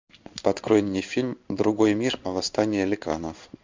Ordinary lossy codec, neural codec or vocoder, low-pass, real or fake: MP3, 64 kbps; codec, 16 kHz in and 24 kHz out, 1 kbps, XY-Tokenizer; 7.2 kHz; fake